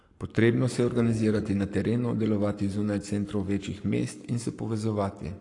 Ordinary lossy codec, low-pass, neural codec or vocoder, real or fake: AAC, 48 kbps; 10.8 kHz; codec, 44.1 kHz, 7.8 kbps, Pupu-Codec; fake